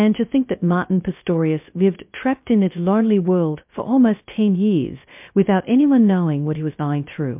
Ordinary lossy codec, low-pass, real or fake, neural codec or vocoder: MP3, 32 kbps; 3.6 kHz; fake; codec, 16 kHz, 0.2 kbps, FocalCodec